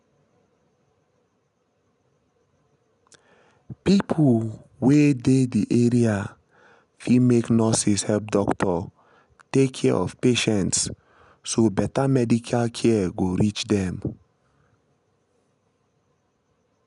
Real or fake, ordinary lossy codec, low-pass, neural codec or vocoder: real; none; 10.8 kHz; none